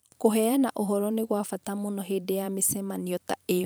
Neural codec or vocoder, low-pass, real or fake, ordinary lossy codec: vocoder, 44.1 kHz, 128 mel bands every 512 samples, BigVGAN v2; none; fake; none